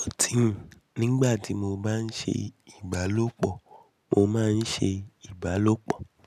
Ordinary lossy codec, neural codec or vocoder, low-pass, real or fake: none; none; 14.4 kHz; real